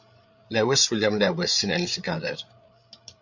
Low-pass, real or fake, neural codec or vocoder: 7.2 kHz; fake; codec, 16 kHz, 8 kbps, FreqCodec, larger model